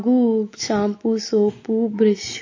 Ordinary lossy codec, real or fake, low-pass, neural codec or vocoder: MP3, 32 kbps; real; 7.2 kHz; none